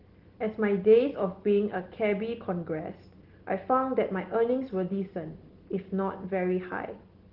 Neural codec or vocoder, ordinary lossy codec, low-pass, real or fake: none; Opus, 16 kbps; 5.4 kHz; real